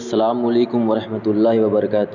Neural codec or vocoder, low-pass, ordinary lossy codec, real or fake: none; 7.2 kHz; none; real